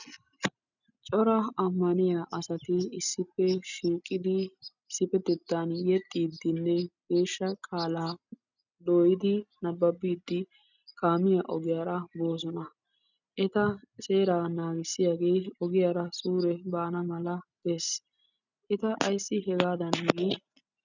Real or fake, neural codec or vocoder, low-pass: real; none; 7.2 kHz